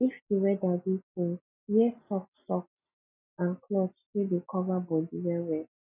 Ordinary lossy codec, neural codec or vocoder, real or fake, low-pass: none; none; real; 3.6 kHz